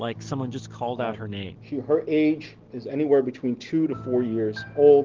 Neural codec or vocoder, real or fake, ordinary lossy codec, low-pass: none; real; Opus, 16 kbps; 7.2 kHz